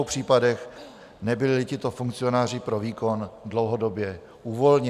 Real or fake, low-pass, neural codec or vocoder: real; 14.4 kHz; none